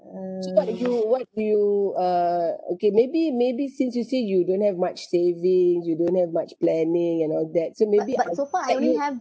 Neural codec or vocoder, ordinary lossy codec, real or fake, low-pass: none; none; real; none